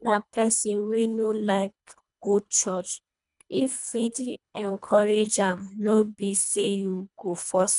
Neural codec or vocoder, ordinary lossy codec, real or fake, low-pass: codec, 24 kHz, 1.5 kbps, HILCodec; none; fake; 10.8 kHz